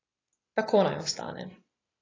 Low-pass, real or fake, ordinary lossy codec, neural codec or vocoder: 7.2 kHz; real; AAC, 32 kbps; none